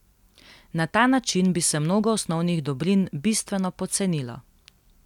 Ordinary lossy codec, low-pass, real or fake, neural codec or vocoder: none; 19.8 kHz; real; none